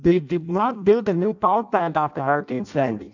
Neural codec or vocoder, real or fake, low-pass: codec, 16 kHz in and 24 kHz out, 0.6 kbps, FireRedTTS-2 codec; fake; 7.2 kHz